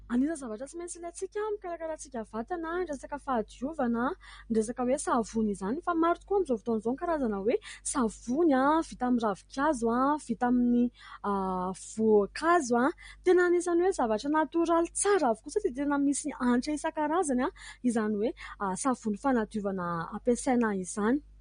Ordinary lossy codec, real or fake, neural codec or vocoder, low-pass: MP3, 48 kbps; real; none; 9.9 kHz